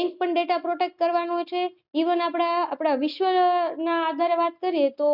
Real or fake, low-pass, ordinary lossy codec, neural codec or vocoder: real; 5.4 kHz; none; none